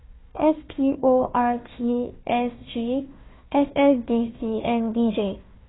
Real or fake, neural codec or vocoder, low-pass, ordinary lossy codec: fake; codec, 16 kHz, 1 kbps, FunCodec, trained on Chinese and English, 50 frames a second; 7.2 kHz; AAC, 16 kbps